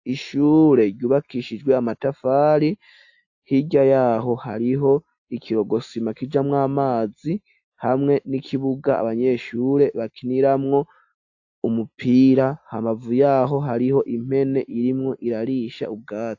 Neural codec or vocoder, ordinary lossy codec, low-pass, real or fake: none; AAC, 48 kbps; 7.2 kHz; real